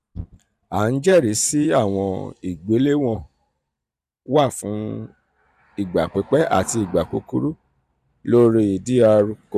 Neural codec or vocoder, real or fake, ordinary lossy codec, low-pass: vocoder, 44.1 kHz, 128 mel bands every 512 samples, BigVGAN v2; fake; none; 14.4 kHz